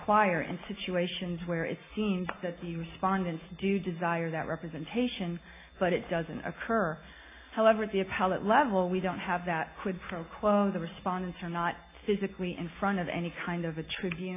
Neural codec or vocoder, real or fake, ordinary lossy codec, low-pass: none; real; AAC, 24 kbps; 3.6 kHz